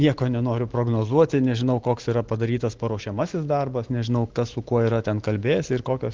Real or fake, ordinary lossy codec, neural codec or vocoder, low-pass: real; Opus, 16 kbps; none; 7.2 kHz